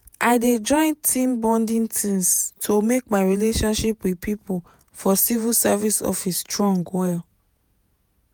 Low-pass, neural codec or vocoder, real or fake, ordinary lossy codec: none; vocoder, 48 kHz, 128 mel bands, Vocos; fake; none